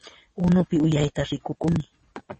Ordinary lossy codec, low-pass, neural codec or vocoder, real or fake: MP3, 32 kbps; 9.9 kHz; vocoder, 22.05 kHz, 80 mel bands, Vocos; fake